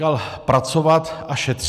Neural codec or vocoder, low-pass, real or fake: none; 14.4 kHz; real